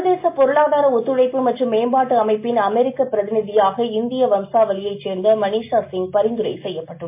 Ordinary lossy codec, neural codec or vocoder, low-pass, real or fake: MP3, 24 kbps; none; 3.6 kHz; real